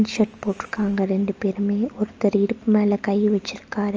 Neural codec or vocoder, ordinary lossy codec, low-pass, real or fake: none; Opus, 24 kbps; 7.2 kHz; real